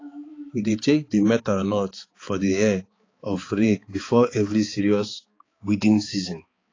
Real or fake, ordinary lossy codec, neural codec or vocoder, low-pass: fake; AAC, 32 kbps; codec, 16 kHz, 4 kbps, X-Codec, HuBERT features, trained on balanced general audio; 7.2 kHz